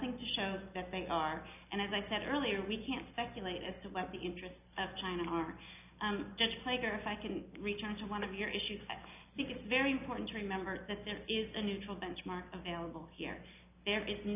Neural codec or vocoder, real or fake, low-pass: none; real; 3.6 kHz